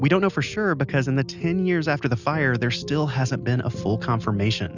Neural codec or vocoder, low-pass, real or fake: none; 7.2 kHz; real